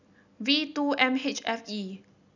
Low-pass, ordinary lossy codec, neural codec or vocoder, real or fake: 7.2 kHz; none; none; real